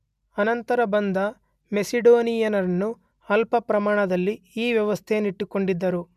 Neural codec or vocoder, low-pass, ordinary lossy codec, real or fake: none; 14.4 kHz; none; real